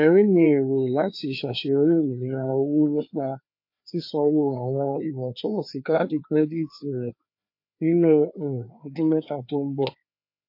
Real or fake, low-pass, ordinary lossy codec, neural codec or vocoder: fake; 5.4 kHz; MP3, 32 kbps; codec, 16 kHz, 2 kbps, FreqCodec, larger model